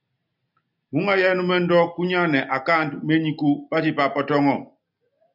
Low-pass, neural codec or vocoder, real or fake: 5.4 kHz; none; real